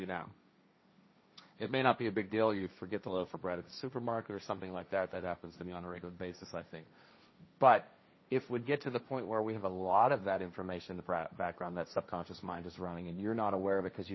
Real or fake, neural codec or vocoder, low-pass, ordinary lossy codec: fake; codec, 16 kHz, 1.1 kbps, Voila-Tokenizer; 7.2 kHz; MP3, 24 kbps